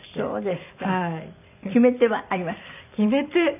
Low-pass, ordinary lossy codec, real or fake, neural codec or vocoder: 3.6 kHz; none; real; none